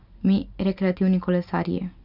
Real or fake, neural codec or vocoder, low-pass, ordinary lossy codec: real; none; 5.4 kHz; none